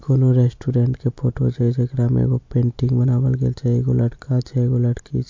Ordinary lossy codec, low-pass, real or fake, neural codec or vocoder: MP3, 48 kbps; 7.2 kHz; real; none